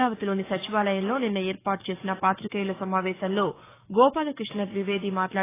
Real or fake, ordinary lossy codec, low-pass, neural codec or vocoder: fake; AAC, 16 kbps; 3.6 kHz; codec, 44.1 kHz, 7.8 kbps, DAC